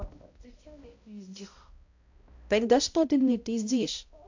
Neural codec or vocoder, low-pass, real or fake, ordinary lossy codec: codec, 16 kHz, 0.5 kbps, X-Codec, HuBERT features, trained on balanced general audio; 7.2 kHz; fake; AAC, 48 kbps